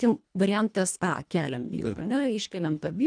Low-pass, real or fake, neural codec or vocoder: 9.9 kHz; fake; codec, 24 kHz, 1.5 kbps, HILCodec